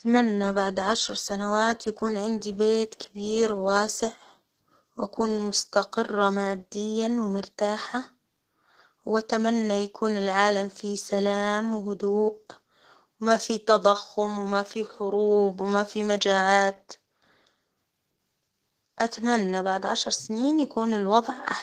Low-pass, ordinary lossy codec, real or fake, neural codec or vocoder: 14.4 kHz; Opus, 16 kbps; fake; codec, 32 kHz, 1.9 kbps, SNAC